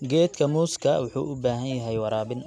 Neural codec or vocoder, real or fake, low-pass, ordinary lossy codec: none; real; none; none